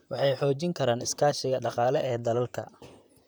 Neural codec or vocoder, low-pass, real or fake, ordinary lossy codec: vocoder, 44.1 kHz, 128 mel bands, Pupu-Vocoder; none; fake; none